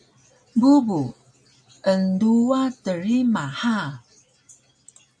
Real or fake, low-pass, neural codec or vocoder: real; 9.9 kHz; none